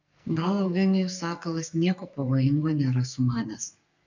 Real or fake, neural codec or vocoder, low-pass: fake; codec, 44.1 kHz, 2.6 kbps, SNAC; 7.2 kHz